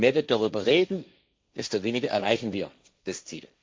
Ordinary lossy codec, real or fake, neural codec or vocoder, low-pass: none; fake; codec, 16 kHz, 1.1 kbps, Voila-Tokenizer; none